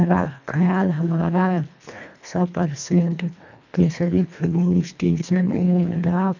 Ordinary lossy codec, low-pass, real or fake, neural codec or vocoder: none; 7.2 kHz; fake; codec, 24 kHz, 1.5 kbps, HILCodec